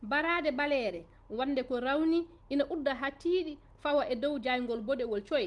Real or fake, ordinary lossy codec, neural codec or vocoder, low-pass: real; Opus, 24 kbps; none; 10.8 kHz